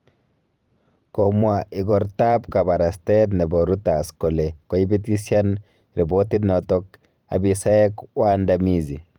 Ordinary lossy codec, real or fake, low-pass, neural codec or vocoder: Opus, 32 kbps; fake; 19.8 kHz; vocoder, 44.1 kHz, 128 mel bands every 512 samples, BigVGAN v2